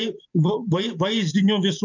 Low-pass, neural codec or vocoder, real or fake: 7.2 kHz; vocoder, 44.1 kHz, 128 mel bands every 512 samples, BigVGAN v2; fake